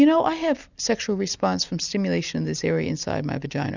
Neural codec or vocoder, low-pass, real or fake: none; 7.2 kHz; real